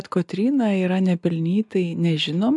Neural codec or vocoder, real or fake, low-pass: none; real; 10.8 kHz